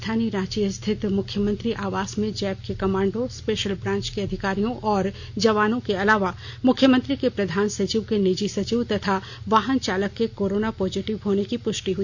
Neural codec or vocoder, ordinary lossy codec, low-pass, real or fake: none; MP3, 48 kbps; 7.2 kHz; real